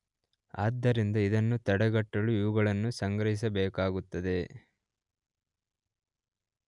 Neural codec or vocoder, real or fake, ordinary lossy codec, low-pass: none; real; none; 10.8 kHz